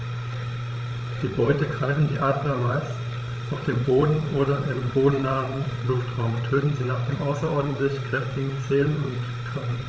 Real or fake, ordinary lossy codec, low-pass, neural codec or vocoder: fake; none; none; codec, 16 kHz, 16 kbps, FreqCodec, larger model